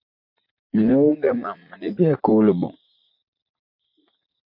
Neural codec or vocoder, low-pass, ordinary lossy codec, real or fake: vocoder, 44.1 kHz, 80 mel bands, Vocos; 5.4 kHz; MP3, 32 kbps; fake